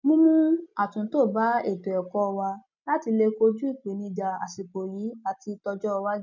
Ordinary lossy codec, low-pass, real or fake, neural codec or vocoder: none; 7.2 kHz; real; none